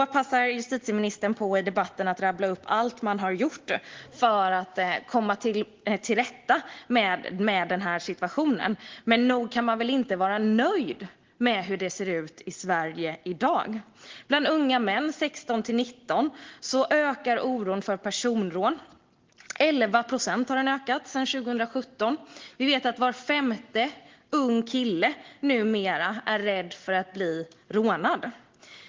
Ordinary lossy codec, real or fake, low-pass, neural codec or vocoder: Opus, 16 kbps; real; 7.2 kHz; none